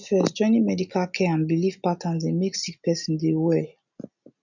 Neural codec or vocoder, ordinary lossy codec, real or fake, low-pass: none; none; real; 7.2 kHz